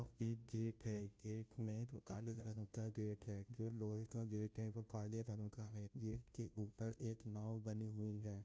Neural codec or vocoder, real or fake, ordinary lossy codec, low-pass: codec, 16 kHz, 0.5 kbps, FunCodec, trained on Chinese and English, 25 frames a second; fake; none; none